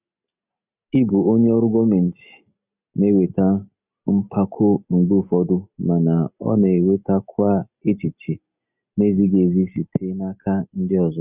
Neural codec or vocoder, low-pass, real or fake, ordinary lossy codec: none; 3.6 kHz; real; none